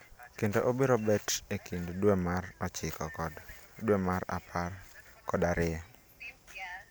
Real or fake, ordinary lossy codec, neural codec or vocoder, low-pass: real; none; none; none